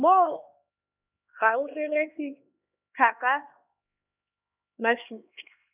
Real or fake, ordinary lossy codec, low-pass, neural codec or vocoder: fake; none; 3.6 kHz; codec, 16 kHz, 2 kbps, X-Codec, HuBERT features, trained on LibriSpeech